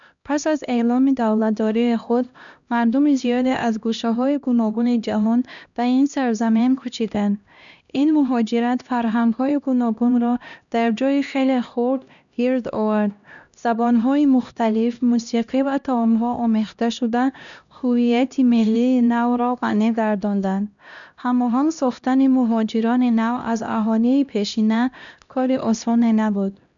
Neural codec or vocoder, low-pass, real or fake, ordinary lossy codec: codec, 16 kHz, 1 kbps, X-Codec, HuBERT features, trained on LibriSpeech; 7.2 kHz; fake; none